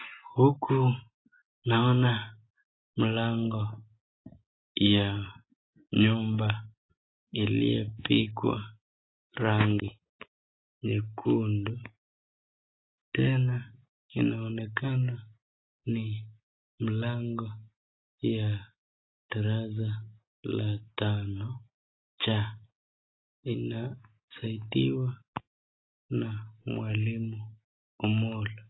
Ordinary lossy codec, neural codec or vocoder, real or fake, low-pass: AAC, 16 kbps; none; real; 7.2 kHz